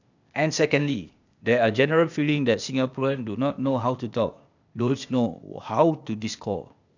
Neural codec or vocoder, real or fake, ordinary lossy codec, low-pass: codec, 16 kHz, 0.8 kbps, ZipCodec; fake; none; 7.2 kHz